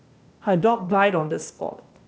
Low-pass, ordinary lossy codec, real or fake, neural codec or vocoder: none; none; fake; codec, 16 kHz, 0.8 kbps, ZipCodec